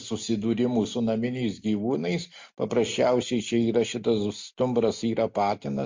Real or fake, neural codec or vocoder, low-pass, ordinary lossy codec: real; none; 7.2 kHz; MP3, 48 kbps